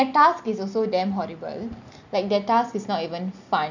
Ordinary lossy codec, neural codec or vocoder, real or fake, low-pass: none; none; real; 7.2 kHz